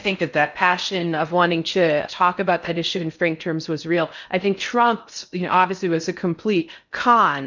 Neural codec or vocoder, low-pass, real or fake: codec, 16 kHz in and 24 kHz out, 0.6 kbps, FocalCodec, streaming, 4096 codes; 7.2 kHz; fake